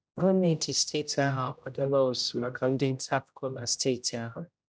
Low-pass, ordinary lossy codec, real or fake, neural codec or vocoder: none; none; fake; codec, 16 kHz, 0.5 kbps, X-Codec, HuBERT features, trained on general audio